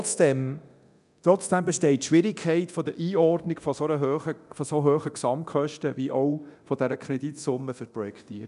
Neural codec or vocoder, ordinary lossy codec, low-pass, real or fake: codec, 24 kHz, 0.9 kbps, DualCodec; none; 10.8 kHz; fake